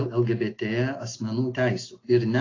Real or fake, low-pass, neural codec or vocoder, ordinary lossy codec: real; 7.2 kHz; none; AAC, 32 kbps